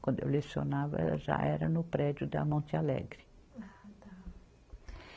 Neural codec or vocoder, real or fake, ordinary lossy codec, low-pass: none; real; none; none